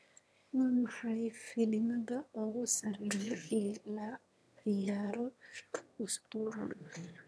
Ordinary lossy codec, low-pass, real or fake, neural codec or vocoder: none; none; fake; autoencoder, 22.05 kHz, a latent of 192 numbers a frame, VITS, trained on one speaker